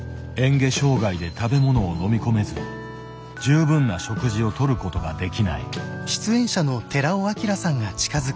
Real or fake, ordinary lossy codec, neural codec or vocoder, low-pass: real; none; none; none